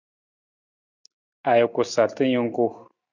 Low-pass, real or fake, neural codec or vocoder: 7.2 kHz; real; none